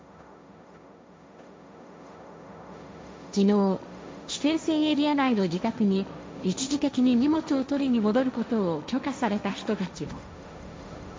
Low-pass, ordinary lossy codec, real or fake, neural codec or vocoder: none; none; fake; codec, 16 kHz, 1.1 kbps, Voila-Tokenizer